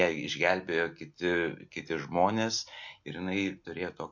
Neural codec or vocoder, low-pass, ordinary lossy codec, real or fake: none; 7.2 kHz; MP3, 48 kbps; real